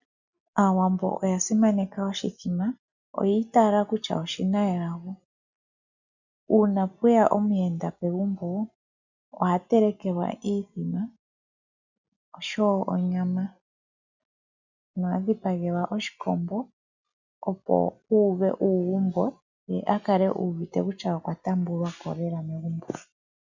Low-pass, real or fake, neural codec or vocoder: 7.2 kHz; real; none